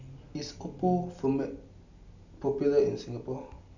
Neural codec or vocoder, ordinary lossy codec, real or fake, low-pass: none; none; real; 7.2 kHz